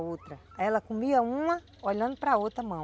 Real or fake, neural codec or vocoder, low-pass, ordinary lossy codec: real; none; none; none